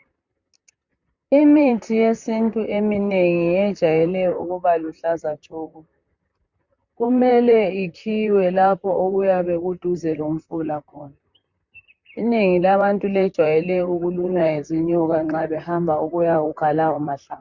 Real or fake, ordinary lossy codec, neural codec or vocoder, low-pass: fake; Opus, 64 kbps; vocoder, 44.1 kHz, 128 mel bands, Pupu-Vocoder; 7.2 kHz